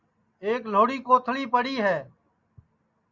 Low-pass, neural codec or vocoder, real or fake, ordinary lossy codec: 7.2 kHz; none; real; Opus, 64 kbps